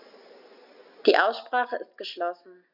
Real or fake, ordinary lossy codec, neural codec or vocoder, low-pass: real; none; none; 5.4 kHz